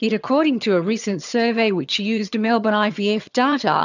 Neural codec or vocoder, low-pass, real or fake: vocoder, 22.05 kHz, 80 mel bands, HiFi-GAN; 7.2 kHz; fake